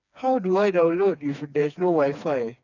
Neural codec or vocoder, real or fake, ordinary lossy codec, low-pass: codec, 16 kHz, 2 kbps, FreqCodec, smaller model; fake; none; 7.2 kHz